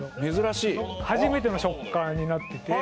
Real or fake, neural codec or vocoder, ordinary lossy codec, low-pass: real; none; none; none